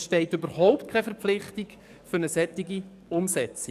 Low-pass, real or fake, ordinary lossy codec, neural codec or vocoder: 14.4 kHz; fake; none; codec, 44.1 kHz, 7.8 kbps, DAC